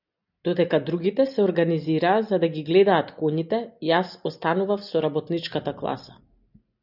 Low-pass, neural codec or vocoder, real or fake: 5.4 kHz; none; real